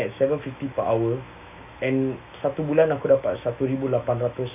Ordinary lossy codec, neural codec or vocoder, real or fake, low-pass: none; autoencoder, 48 kHz, 128 numbers a frame, DAC-VAE, trained on Japanese speech; fake; 3.6 kHz